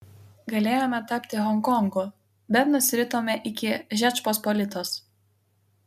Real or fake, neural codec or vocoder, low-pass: real; none; 14.4 kHz